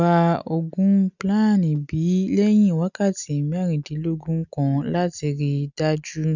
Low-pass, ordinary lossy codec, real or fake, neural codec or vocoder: 7.2 kHz; none; real; none